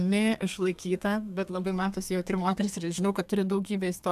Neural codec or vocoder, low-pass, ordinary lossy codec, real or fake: codec, 32 kHz, 1.9 kbps, SNAC; 14.4 kHz; AAC, 96 kbps; fake